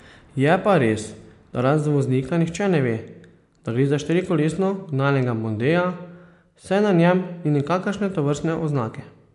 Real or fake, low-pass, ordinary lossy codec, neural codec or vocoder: real; 10.8 kHz; MP3, 64 kbps; none